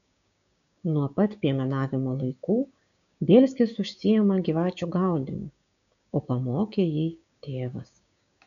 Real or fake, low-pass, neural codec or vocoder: fake; 7.2 kHz; codec, 16 kHz, 6 kbps, DAC